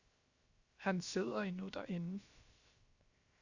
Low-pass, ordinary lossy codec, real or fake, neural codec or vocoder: 7.2 kHz; Opus, 64 kbps; fake; codec, 16 kHz, 0.7 kbps, FocalCodec